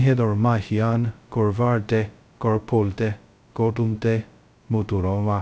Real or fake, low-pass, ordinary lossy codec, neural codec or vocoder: fake; none; none; codec, 16 kHz, 0.2 kbps, FocalCodec